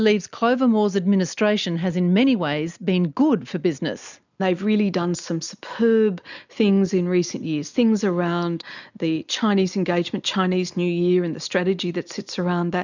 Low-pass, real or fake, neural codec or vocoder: 7.2 kHz; real; none